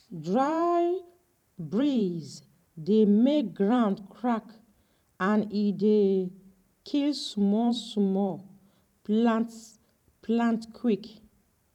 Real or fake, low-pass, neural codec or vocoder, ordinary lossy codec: fake; 19.8 kHz; vocoder, 44.1 kHz, 128 mel bands every 512 samples, BigVGAN v2; none